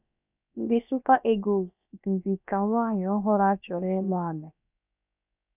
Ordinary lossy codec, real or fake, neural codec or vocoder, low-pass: none; fake; codec, 16 kHz, about 1 kbps, DyCAST, with the encoder's durations; 3.6 kHz